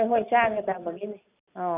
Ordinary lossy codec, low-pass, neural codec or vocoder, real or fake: none; 3.6 kHz; none; real